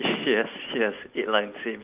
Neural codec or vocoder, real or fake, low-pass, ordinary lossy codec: none; real; 3.6 kHz; Opus, 32 kbps